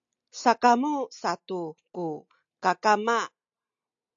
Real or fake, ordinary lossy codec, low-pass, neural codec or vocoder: real; AAC, 64 kbps; 7.2 kHz; none